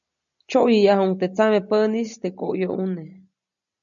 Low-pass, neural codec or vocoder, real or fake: 7.2 kHz; none; real